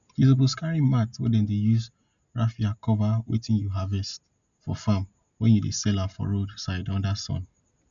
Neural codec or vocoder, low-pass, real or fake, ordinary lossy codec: none; 7.2 kHz; real; none